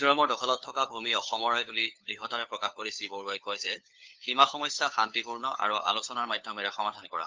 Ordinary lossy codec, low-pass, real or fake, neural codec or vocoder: Opus, 24 kbps; 7.2 kHz; fake; codec, 16 kHz, 4 kbps, FunCodec, trained on LibriTTS, 50 frames a second